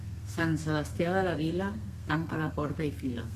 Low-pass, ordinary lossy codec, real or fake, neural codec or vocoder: 14.4 kHz; Opus, 64 kbps; fake; codec, 32 kHz, 1.9 kbps, SNAC